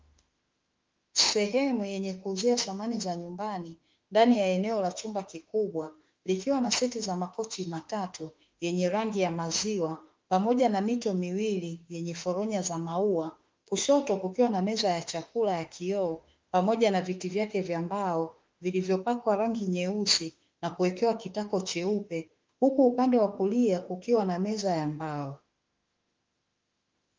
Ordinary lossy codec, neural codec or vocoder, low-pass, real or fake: Opus, 32 kbps; autoencoder, 48 kHz, 32 numbers a frame, DAC-VAE, trained on Japanese speech; 7.2 kHz; fake